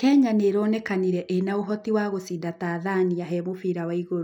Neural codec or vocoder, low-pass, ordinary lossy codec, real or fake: none; 19.8 kHz; none; real